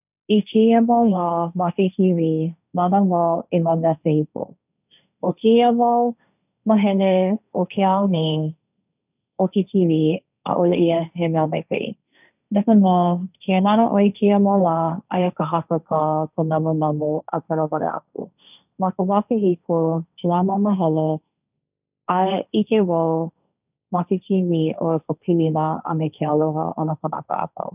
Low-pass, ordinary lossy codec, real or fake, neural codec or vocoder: 3.6 kHz; none; fake; codec, 16 kHz, 1.1 kbps, Voila-Tokenizer